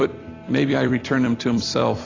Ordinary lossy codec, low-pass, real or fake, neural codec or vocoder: AAC, 32 kbps; 7.2 kHz; fake; vocoder, 44.1 kHz, 128 mel bands every 256 samples, BigVGAN v2